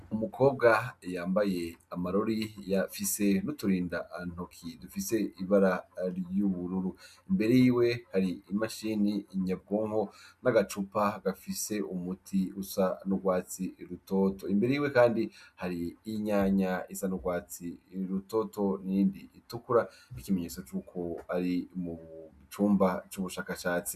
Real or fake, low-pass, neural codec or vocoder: real; 14.4 kHz; none